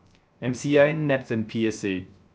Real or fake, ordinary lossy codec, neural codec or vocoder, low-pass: fake; none; codec, 16 kHz, 0.3 kbps, FocalCodec; none